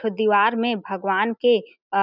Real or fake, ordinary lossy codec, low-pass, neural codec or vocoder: real; none; 5.4 kHz; none